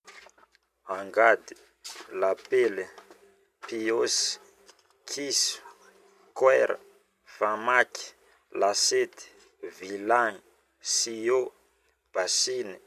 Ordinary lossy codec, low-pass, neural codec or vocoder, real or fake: none; 14.4 kHz; vocoder, 44.1 kHz, 128 mel bands every 256 samples, BigVGAN v2; fake